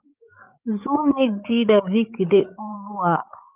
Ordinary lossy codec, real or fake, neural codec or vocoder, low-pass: Opus, 24 kbps; fake; codec, 16 kHz, 8 kbps, FreqCodec, larger model; 3.6 kHz